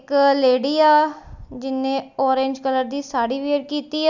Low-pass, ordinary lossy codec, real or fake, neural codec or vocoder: 7.2 kHz; none; real; none